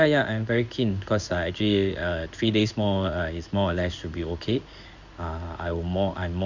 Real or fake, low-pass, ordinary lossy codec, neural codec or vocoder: fake; 7.2 kHz; none; codec, 16 kHz in and 24 kHz out, 1 kbps, XY-Tokenizer